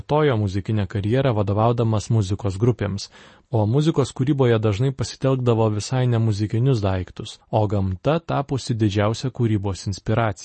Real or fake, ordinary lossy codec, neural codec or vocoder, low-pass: real; MP3, 32 kbps; none; 10.8 kHz